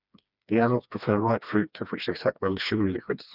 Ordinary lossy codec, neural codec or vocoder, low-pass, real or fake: none; codec, 16 kHz, 2 kbps, FreqCodec, smaller model; 5.4 kHz; fake